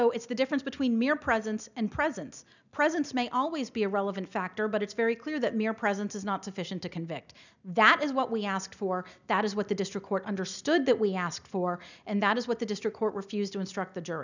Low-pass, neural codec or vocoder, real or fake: 7.2 kHz; none; real